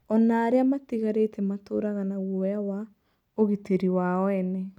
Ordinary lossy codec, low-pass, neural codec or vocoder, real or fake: none; 19.8 kHz; none; real